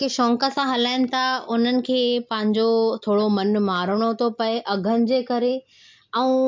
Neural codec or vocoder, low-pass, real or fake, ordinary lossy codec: none; 7.2 kHz; real; MP3, 64 kbps